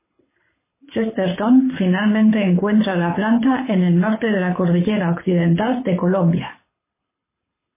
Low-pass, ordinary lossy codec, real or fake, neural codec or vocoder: 3.6 kHz; MP3, 16 kbps; fake; codec, 24 kHz, 6 kbps, HILCodec